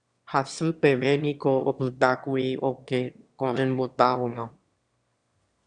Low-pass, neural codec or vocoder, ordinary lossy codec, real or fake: 9.9 kHz; autoencoder, 22.05 kHz, a latent of 192 numbers a frame, VITS, trained on one speaker; Opus, 64 kbps; fake